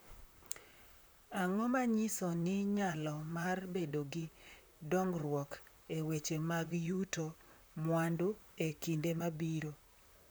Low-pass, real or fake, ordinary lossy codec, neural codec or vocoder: none; fake; none; vocoder, 44.1 kHz, 128 mel bands, Pupu-Vocoder